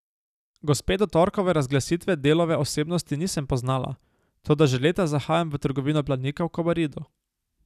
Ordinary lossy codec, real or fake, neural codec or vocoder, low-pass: none; real; none; 14.4 kHz